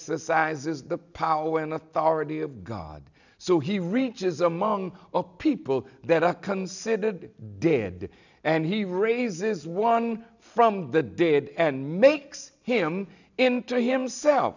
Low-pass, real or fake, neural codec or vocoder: 7.2 kHz; real; none